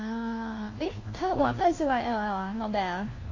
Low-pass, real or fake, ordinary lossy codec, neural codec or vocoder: 7.2 kHz; fake; AAC, 32 kbps; codec, 16 kHz, 1 kbps, FunCodec, trained on LibriTTS, 50 frames a second